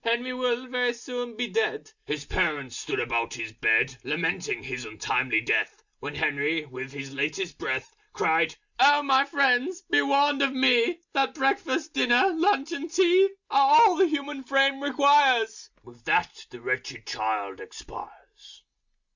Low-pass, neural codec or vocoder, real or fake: 7.2 kHz; none; real